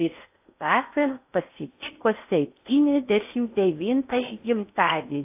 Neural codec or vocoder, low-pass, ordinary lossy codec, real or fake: codec, 16 kHz in and 24 kHz out, 0.6 kbps, FocalCodec, streaming, 4096 codes; 3.6 kHz; AAC, 32 kbps; fake